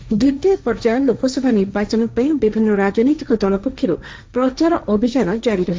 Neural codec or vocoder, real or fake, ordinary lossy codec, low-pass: codec, 16 kHz, 1.1 kbps, Voila-Tokenizer; fake; none; none